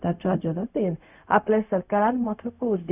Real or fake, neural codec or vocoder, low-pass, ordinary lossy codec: fake; codec, 16 kHz, 0.4 kbps, LongCat-Audio-Codec; 3.6 kHz; none